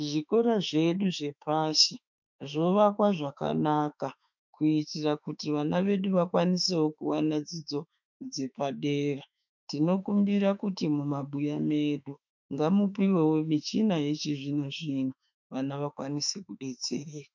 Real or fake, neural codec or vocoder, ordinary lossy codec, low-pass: fake; autoencoder, 48 kHz, 32 numbers a frame, DAC-VAE, trained on Japanese speech; MP3, 64 kbps; 7.2 kHz